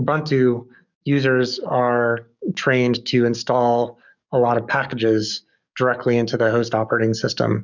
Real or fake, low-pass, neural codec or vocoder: fake; 7.2 kHz; codec, 44.1 kHz, 7.8 kbps, DAC